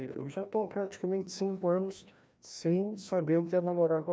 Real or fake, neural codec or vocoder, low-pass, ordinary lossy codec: fake; codec, 16 kHz, 1 kbps, FreqCodec, larger model; none; none